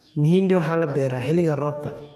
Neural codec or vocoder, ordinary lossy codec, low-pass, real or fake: codec, 44.1 kHz, 2.6 kbps, DAC; none; 14.4 kHz; fake